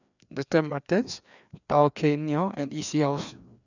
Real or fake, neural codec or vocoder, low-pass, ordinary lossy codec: fake; codec, 16 kHz, 2 kbps, FreqCodec, larger model; 7.2 kHz; none